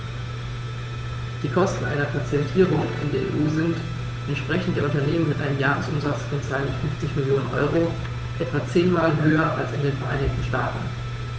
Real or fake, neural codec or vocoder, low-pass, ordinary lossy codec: fake; codec, 16 kHz, 8 kbps, FunCodec, trained on Chinese and English, 25 frames a second; none; none